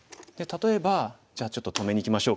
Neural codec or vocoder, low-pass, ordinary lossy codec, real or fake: none; none; none; real